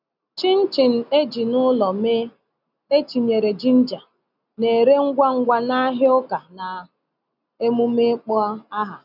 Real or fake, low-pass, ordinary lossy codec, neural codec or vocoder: real; 5.4 kHz; none; none